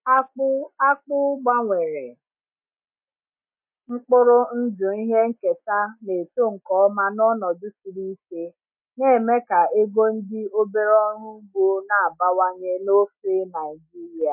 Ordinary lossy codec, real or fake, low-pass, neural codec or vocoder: MP3, 32 kbps; real; 3.6 kHz; none